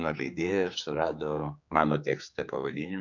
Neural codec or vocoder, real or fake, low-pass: codec, 16 kHz, 4 kbps, X-Codec, HuBERT features, trained on general audio; fake; 7.2 kHz